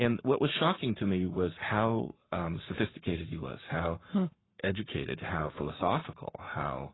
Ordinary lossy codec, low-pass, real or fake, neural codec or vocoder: AAC, 16 kbps; 7.2 kHz; fake; codec, 44.1 kHz, 7.8 kbps, Pupu-Codec